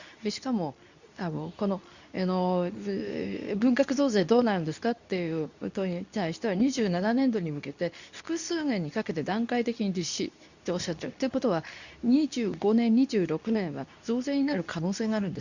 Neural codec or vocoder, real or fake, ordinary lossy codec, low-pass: codec, 24 kHz, 0.9 kbps, WavTokenizer, medium speech release version 2; fake; none; 7.2 kHz